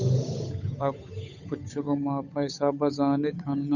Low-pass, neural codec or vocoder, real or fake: 7.2 kHz; codec, 16 kHz, 8 kbps, FunCodec, trained on Chinese and English, 25 frames a second; fake